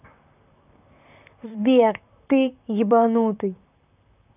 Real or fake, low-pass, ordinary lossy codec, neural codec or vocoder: fake; 3.6 kHz; none; vocoder, 44.1 kHz, 128 mel bands, Pupu-Vocoder